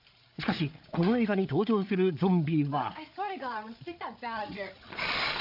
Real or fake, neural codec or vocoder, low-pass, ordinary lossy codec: fake; codec, 16 kHz, 8 kbps, FreqCodec, larger model; 5.4 kHz; AAC, 48 kbps